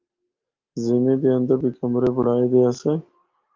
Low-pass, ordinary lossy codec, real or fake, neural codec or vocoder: 7.2 kHz; Opus, 32 kbps; real; none